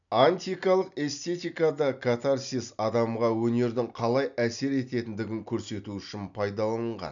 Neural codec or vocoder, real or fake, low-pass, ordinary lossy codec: none; real; 7.2 kHz; none